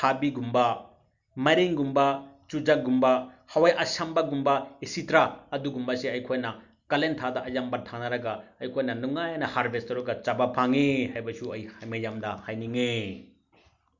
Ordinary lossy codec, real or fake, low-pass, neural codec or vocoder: none; real; 7.2 kHz; none